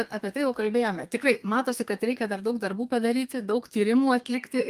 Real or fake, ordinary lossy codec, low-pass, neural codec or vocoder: fake; Opus, 32 kbps; 14.4 kHz; codec, 32 kHz, 1.9 kbps, SNAC